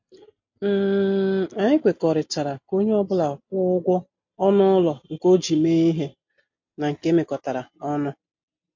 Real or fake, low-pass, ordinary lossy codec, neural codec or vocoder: real; 7.2 kHz; MP3, 48 kbps; none